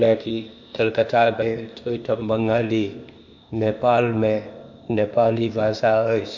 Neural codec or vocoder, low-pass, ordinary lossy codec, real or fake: codec, 16 kHz, 0.8 kbps, ZipCodec; 7.2 kHz; MP3, 48 kbps; fake